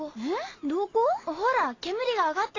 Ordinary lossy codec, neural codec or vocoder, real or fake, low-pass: AAC, 32 kbps; none; real; 7.2 kHz